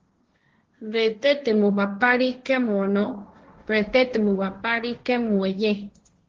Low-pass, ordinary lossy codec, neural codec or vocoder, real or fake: 7.2 kHz; Opus, 16 kbps; codec, 16 kHz, 1.1 kbps, Voila-Tokenizer; fake